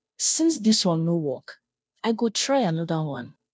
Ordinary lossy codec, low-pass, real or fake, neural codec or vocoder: none; none; fake; codec, 16 kHz, 0.5 kbps, FunCodec, trained on Chinese and English, 25 frames a second